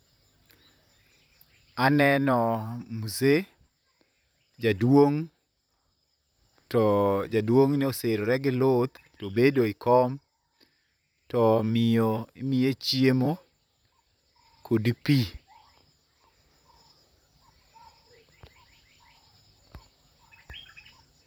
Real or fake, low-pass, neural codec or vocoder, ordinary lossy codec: fake; none; vocoder, 44.1 kHz, 128 mel bands, Pupu-Vocoder; none